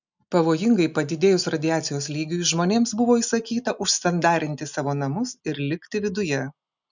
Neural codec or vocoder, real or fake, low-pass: none; real; 7.2 kHz